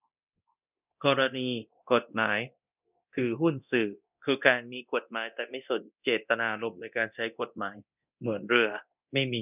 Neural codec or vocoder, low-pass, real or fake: codec, 24 kHz, 0.9 kbps, DualCodec; 3.6 kHz; fake